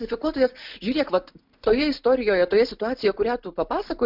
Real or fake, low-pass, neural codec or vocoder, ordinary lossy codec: real; 5.4 kHz; none; MP3, 48 kbps